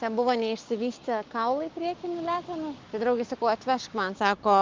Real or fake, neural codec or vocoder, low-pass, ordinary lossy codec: fake; codec, 16 kHz, 6 kbps, DAC; 7.2 kHz; Opus, 24 kbps